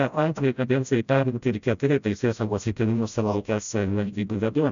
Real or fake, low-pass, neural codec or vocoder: fake; 7.2 kHz; codec, 16 kHz, 0.5 kbps, FreqCodec, smaller model